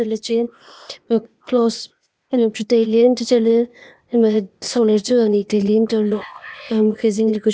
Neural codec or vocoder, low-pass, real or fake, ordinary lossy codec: codec, 16 kHz, 0.8 kbps, ZipCodec; none; fake; none